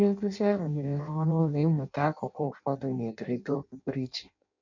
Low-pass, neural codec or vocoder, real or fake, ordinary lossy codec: 7.2 kHz; codec, 16 kHz in and 24 kHz out, 0.6 kbps, FireRedTTS-2 codec; fake; none